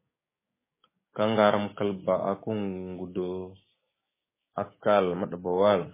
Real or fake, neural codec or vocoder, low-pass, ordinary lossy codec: fake; codec, 16 kHz, 6 kbps, DAC; 3.6 kHz; MP3, 16 kbps